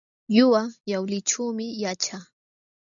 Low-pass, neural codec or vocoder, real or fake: 7.2 kHz; none; real